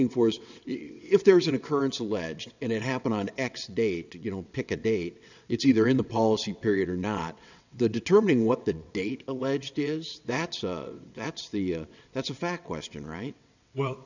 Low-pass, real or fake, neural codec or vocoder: 7.2 kHz; fake; vocoder, 22.05 kHz, 80 mel bands, WaveNeXt